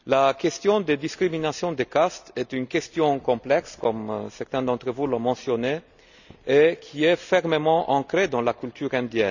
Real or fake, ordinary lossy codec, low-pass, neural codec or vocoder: real; none; 7.2 kHz; none